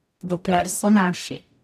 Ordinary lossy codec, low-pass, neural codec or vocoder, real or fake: none; 14.4 kHz; codec, 44.1 kHz, 0.9 kbps, DAC; fake